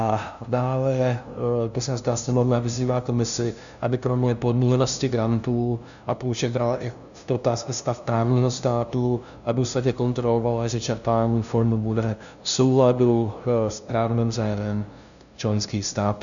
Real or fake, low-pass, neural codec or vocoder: fake; 7.2 kHz; codec, 16 kHz, 0.5 kbps, FunCodec, trained on LibriTTS, 25 frames a second